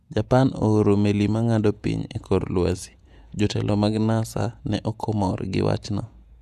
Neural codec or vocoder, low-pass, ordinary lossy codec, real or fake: vocoder, 48 kHz, 128 mel bands, Vocos; 14.4 kHz; none; fake